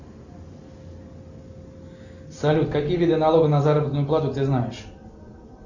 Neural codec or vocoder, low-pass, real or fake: none; 7.2 kHz; real